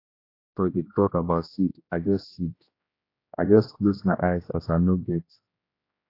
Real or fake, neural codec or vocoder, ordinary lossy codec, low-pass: fake; codec, 16 kHz, 1 kbps, X-Codec, HuBERT features, trained on general audio; AAC, 32 kbps; 5.4 kHz